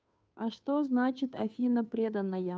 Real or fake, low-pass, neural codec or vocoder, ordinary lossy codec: fake; none; codec, 16 kHz, 2 kbps, FunCodec, trained on Chinese and English, 25 frames a second; none